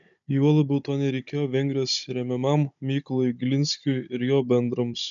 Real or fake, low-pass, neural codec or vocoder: fake; 7.2 kHz; codec, 16 kHz, 16 kbps, FunCodec, trained on Chinese and English, 50 frames a second